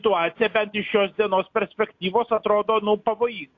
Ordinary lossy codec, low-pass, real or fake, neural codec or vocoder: AAC, 48 kbps; 7.2 kHz; real; none